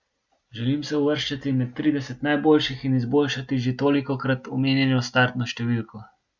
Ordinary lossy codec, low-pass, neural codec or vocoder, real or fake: Opus, 64 kbps; 7.2 kHz; none; real